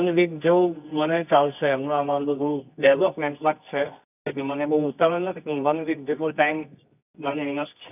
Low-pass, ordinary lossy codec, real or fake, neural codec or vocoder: 3.6 kHz; none; fake; codec, 24 kHz, 0.9 kbps, WavTokenizer, medium music audio release